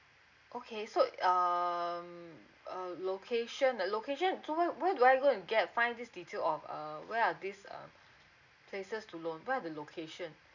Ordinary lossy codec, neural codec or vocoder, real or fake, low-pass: none; none; real; 7.2 kHz